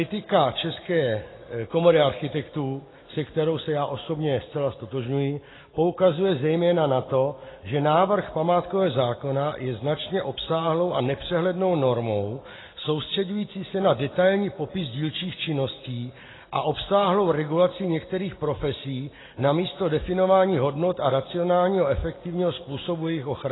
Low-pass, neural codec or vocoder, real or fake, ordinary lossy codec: 7.2 kHz; none; real; AAC, 16 kbps